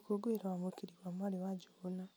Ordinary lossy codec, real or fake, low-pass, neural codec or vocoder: none; real; none; none